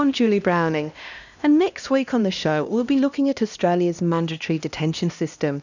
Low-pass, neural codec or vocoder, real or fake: 7.2 kHz; codec, 16 kHz, 1 kbps, X-Codec, HuBERT features, trained on LibriSpeech; fake